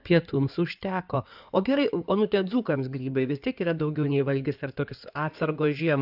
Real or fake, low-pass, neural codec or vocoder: fake; 5.4 kHz; codec, 16 kHz in and 24 kHz out, 2.2 kbps, FireRedTTS-2 codec